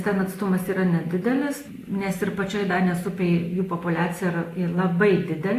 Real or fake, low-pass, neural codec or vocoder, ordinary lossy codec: real; 14.4 kHz; none; AAC, 48 kbps